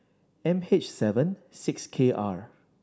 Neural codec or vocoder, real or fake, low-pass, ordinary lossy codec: none; real; none; none